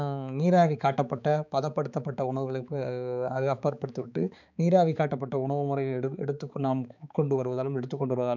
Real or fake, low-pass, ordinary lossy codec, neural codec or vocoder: fake; 7.2 kHz; none; codec, 16 kHz, 4 kbps, X-Codec, HuBERT features, trained on balanced general audio